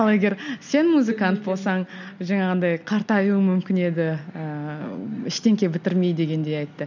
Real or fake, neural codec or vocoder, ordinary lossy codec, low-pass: real; none; none; 7.2 kHz